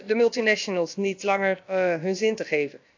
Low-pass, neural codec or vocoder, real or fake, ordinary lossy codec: 7.2 kHz; codec, 16 kHz, about 1 kbps, DyCAST, with the encoder's durations; fake; AAC, 48 kbps